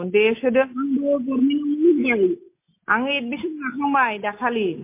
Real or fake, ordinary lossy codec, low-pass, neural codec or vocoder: real; MP3, 24 kbps; 3.6 kHz; none